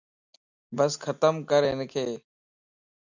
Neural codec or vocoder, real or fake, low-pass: none; real; 7.2 kHz